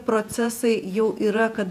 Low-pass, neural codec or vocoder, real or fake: 14.4 kHz; vocoder, 48 kHz, 128 mel bands, Vocos; fake